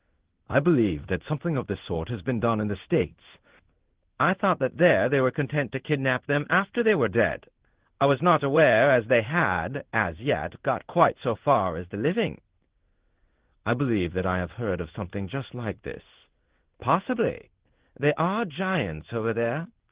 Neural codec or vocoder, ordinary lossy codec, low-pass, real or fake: codec, 16 kHz in and 24 kHz out, 1 kbps, XY-Tokenizer; Opus, 16 kbps; 3.6 kHz; fake